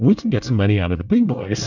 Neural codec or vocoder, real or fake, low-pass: codec, 24 kHz, 1 kbps, SNAC; fake; 7.2 kHz